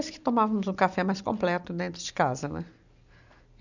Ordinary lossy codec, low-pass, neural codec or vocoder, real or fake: none; 7.2 kHz; none; real